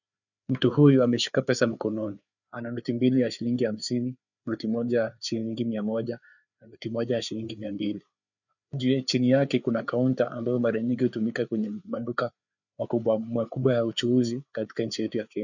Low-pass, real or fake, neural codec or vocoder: 7.2 kHz; fake; codec, 16 kHz, 4 kbps, FreqCodec, larger model